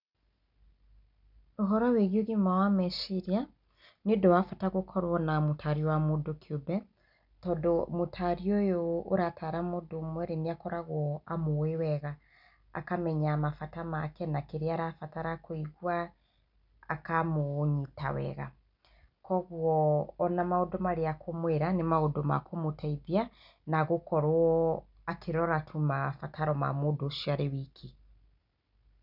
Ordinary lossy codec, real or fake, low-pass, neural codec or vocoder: none; real; 5.4 kHz; none